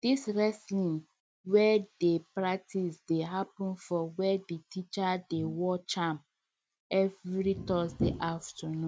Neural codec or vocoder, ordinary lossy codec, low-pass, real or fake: none; none; none; real